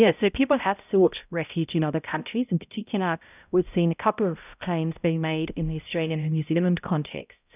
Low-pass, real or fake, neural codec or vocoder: 3.6 kHz; fake; codec, 16 kHz, 0.5 kbps, X-Codec, HuBERT features, trained on balanced general audio